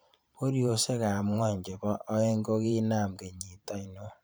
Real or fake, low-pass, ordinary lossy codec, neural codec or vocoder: fake; none; none; vocoder, 44.1 kHz, 128 mel bands every 512 samples, BigVGAN v2